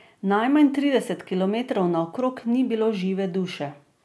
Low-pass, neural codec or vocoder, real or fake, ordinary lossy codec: none; none; real; none